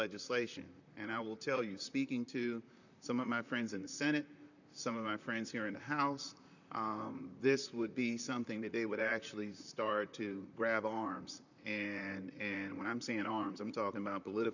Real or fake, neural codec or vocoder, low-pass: fake; vocoder, 44.1 kHz, 128 mel bands, Pupu-Vocoder; 7.2 kHz